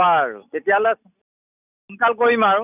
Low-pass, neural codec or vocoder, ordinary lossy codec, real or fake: 3.6 kHz; none; none; real